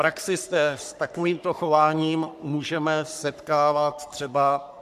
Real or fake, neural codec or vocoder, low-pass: fake; codec, 44.1 kHz, 3.4 kbps, Pupu-Codec; 14.4 kHz